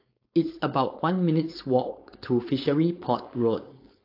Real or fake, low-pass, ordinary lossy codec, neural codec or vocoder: fake; 5.4 kHz; AAC, 32 kbps; codec, 16 kHz, 4.8 kbps, FACodec